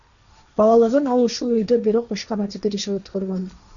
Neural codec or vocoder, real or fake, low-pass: codec, 16 kHz, 1.1 kbps, Voila-Tokenizer; fake; 7.2 kHz